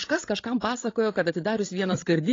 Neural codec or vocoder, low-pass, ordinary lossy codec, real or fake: codec, 16 kHz, 16 kbps, FreqCodec, larger model; 7.2 kHz; AAC, 32 kbps; fake